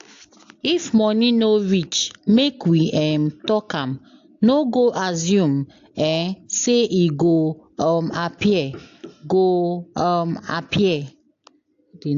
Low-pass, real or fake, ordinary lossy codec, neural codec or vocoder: 7.2 kHz; real; AAC, 48 kbps; none